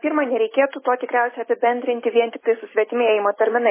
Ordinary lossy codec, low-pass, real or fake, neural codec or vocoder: MP3, 16 kbps; 3.6 kHz; real; none